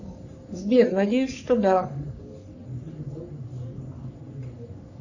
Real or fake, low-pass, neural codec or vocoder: fake; 7.2 kHz; codec, 44.1 kHz, 3.4 kbps, Pupu-Codec